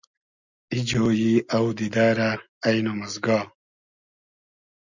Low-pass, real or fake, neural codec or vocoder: 7.2 kHz; real; none